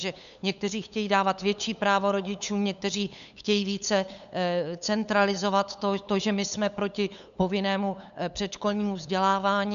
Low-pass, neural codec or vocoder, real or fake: 7.2 kHz; codec, 16 kHz, 8 kbps, FunCodec, trained on LibriTTS, 25 frames a second; fake